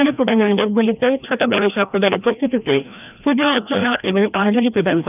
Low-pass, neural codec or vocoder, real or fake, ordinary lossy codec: 3.6 kHz; codec, 16 kHz, 1 kbps, FreqCodec, larger model; fake; none